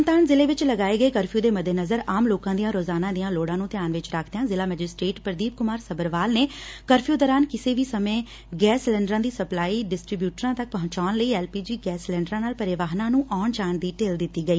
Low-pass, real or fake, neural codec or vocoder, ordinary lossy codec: none; real; none; none